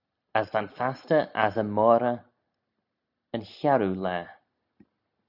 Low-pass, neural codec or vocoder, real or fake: 5.4 kHz; none; real